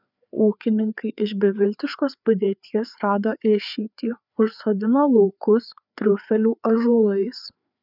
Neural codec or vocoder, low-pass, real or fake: codec, 16 kHz, 4 kbps, FreqCodec, larger model; 5.4 kHz; fake